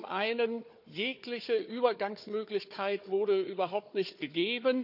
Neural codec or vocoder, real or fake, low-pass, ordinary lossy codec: codec, 16 kHz, 4 kbps, FunCodec, trained on Chinese and English, 50 frames a second; fake; 5.4 kHz; none